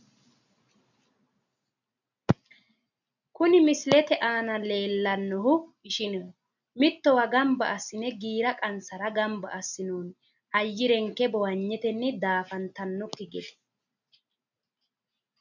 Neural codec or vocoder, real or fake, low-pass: none; real; 7.2 kHz